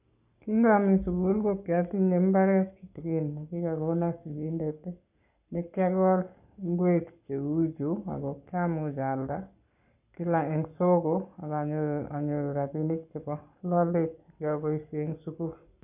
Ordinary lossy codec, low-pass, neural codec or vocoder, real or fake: none; 3.6 kHz; codec, 44.1 kHz, 7.8 kbps, Pupu-Codec; fake